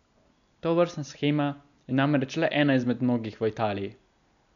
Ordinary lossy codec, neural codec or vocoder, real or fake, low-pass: none; none; real; 7.2 kHz